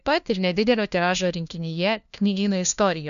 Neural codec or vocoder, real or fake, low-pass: codec, 16 kHz, 1 kbps, FunCodec, trained on LibriTTS, 50 frames a second; fake; 7.2 kHz